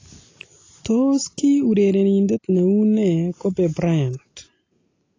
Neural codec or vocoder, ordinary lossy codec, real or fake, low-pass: none; AAC, 32 kbps; real; 7.2 kHz